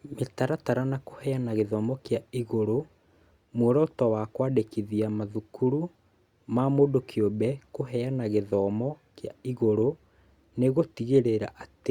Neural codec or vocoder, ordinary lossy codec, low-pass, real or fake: none; Opus, 64 kbps; 19.8 kHz; real